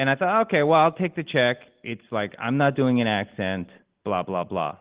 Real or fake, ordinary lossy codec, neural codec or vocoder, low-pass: real; Opus, 16 kbps; none; 3.6 kHz